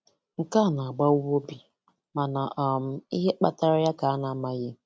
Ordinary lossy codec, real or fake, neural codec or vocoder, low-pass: none; real; none; none